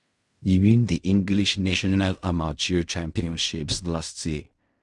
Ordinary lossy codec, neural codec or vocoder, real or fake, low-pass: Opus, 64 kbps; codec, 16 kHz in and 24 kHz out, 0.4 kbps, LongCat-Audio-Codec, fine tuned four codebook decoder; fake; 10.8 kHz